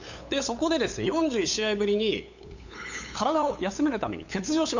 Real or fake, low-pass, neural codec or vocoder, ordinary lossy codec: fake; 7.2 kHz; codec, 16 kHz, 8 kbps, FunCodec, trained on LibriTTS, 25 frames a second; none